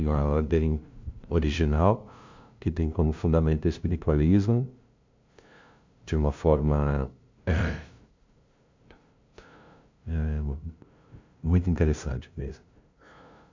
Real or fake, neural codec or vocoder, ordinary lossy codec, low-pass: fake; codec, 16 kHz, 0.5 kbps, FunCodec, trained on LibriTTS, 25 frames a second; MP3, 64 kbps; 7.2 kHz